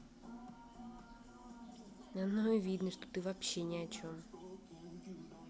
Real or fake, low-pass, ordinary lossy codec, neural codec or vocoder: real; none; none; none